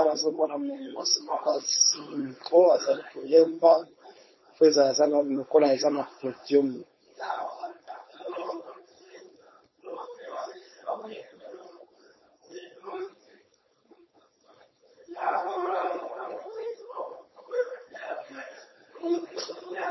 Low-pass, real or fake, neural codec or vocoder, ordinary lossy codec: 7.2 kHz; fake; codec, 16 kHz, 4.8 kbps, FACodec; MP3, 24 kbps